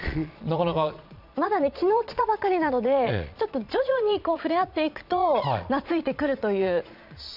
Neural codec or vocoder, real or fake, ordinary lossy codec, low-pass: vocoder, 22.05 kHz, 80 mel bands, Vocos; fake; none; 5.4 kHz